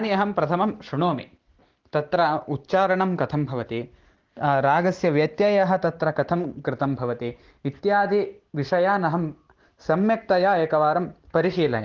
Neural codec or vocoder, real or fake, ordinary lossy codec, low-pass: none; real; Opus, 16 kbps; 7.2 kHz